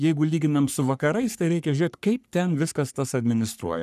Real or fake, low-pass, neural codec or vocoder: fake; 14.4 kHz; codec, 44.1 kHz, 3.4 kbps, Pupu-Codec